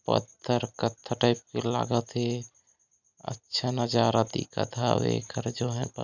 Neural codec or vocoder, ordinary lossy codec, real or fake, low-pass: none; none; real; 7.2 kHz